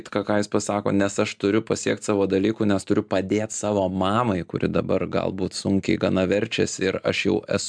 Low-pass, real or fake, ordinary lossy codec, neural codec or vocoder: 9.9 kHz; real; MP3, 96 kbps; none